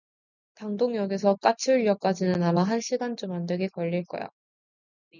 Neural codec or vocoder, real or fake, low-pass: none; real; 7.2 kHz